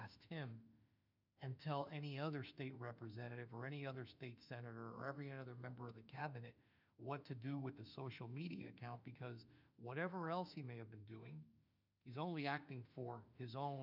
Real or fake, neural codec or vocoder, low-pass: fake; autoencoder, 48 kHz, 32 numbers a frame, DAC-VAE, trained on Japanese speech; 5.4 kHz